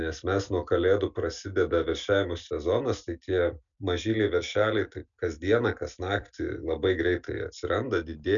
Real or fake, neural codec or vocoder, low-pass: real; none; 7.2 kHz